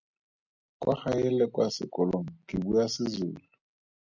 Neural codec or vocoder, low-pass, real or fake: none; 7.2 kHz; real